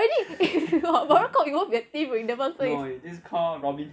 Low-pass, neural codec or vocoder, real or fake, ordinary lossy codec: none; none; real; none